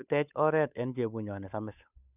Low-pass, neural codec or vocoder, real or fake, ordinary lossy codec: 3.6 kHz; codec, 16 kHz, 8 kbps, FunCodec, trained on Chinese and English, 25 frames a second; fake; none